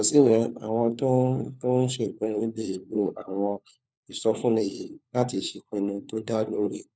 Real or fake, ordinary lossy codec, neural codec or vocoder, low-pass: fake; none; codec, 16 kHz, 4 kbps, FunCodec, trained on LibriTTS, 50 frames a second; none